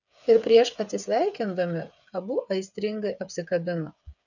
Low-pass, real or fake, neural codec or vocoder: 7.2 kHz; fake; codec, 16 kHz, 8 kbps, FreqCodec, smaller model